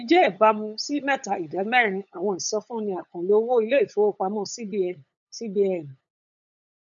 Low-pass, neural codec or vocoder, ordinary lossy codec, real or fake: 7.2 kHz; codec, 16 kHz, 8 kbps, FunCodec, trained on LibriTTS, 25 frames a second; none; fake